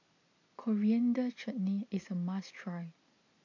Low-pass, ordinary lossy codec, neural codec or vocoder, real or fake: 7.2 kHz; AAC, 48 kbps; none; real